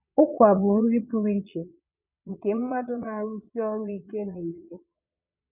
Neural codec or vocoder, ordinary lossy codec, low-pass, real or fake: vocoder, 22.05 kHz, 80 mel bands, Vocos; none; 3.6 kHz; fake